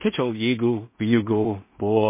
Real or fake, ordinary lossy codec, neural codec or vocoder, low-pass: fake; MP3, 24 kbps; codec, 16 kHz in and 24 kHz out, 0.4 kbps, LongCat-Audio-Codec, two codebook decoder; 3.6 kHz